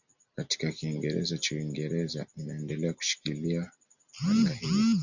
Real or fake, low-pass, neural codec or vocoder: real; 7.2 kHz; none